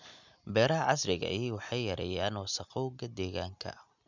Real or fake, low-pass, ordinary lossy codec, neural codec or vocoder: real; 7.2 kHz; none; none